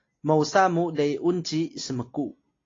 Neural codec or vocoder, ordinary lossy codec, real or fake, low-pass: none; AAC, 32 kbps; real; 7.2 kHz